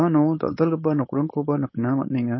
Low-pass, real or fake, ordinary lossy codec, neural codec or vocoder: 7.2 kHz; fake; MP3, 24 kbps; codec, 16 kHz, 4.8 kbps, FACodec